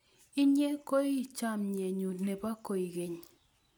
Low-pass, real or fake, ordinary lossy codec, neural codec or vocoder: none; real; none; none